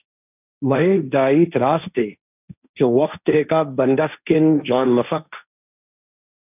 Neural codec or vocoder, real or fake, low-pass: codec, 16 kHz, 1.1 kbps, Voila-Tokenizer; fake; 3.6 kHz